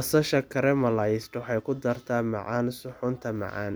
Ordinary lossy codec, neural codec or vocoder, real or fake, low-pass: none; none; real; none